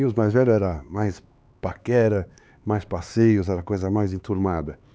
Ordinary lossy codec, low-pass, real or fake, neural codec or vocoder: none; none; fake; codec, 16 kHz, 4 kbps, X-Codec, HuBERT features, trained on LibriSpeech